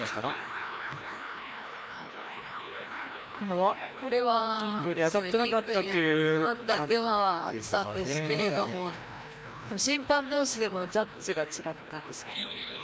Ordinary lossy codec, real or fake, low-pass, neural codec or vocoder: none; fake; none; codec, 16 kHz, 1 kbps, FreqCodec, larger model